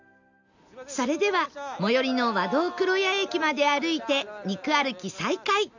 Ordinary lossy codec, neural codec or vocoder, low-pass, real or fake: none; none; 7.2 kHz; real